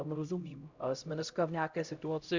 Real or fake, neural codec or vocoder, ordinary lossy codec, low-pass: fake; codec, 16 kHz, 0.5 kbps, X-Codec, HuBERT features, trained on LibriSpeech; Opus, 64 kbps; 7.2 kHz